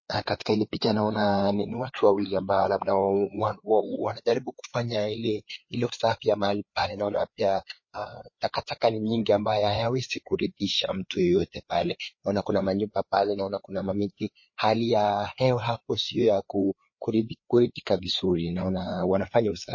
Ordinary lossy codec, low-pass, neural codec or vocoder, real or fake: MP3, 32 kbps; 7.2 kHz; codec, 16 kHz, 4 kbps, FreqCodec, larger model; fake